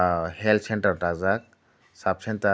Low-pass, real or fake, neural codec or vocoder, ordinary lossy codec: none; real; none; none